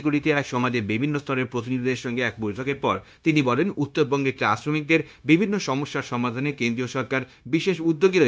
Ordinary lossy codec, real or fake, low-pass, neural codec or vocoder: none; fake; none; codec, 16 kHz, 0.9 kbps, LongCat-Audio-Codec